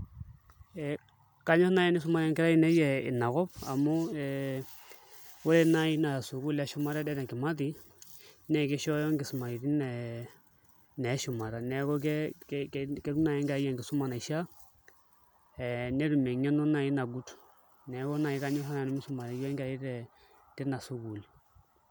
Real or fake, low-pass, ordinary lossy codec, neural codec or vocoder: real; none; none; none